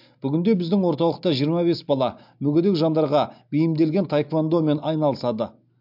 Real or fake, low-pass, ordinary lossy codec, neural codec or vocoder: real; 5.4 kHz; MP3, 48 kbps; none